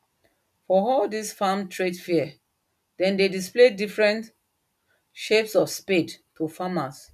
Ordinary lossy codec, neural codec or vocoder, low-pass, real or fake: none; none; 14.4 kHz; real